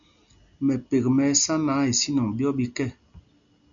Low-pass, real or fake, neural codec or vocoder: 7.2 kHz; real; none